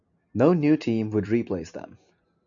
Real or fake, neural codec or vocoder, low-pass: real; none; 7.2 kHz